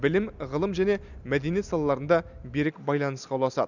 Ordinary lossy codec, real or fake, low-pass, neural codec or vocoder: none; real; 7.2 kHz; none